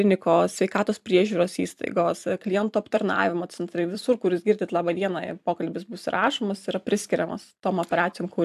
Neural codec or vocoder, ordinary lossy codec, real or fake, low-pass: vocoder, 44.1 kHz, 128 mel bands every 256 samples, BigVGAN v2; AAC, 96 kbps; fake; 14.4 kHz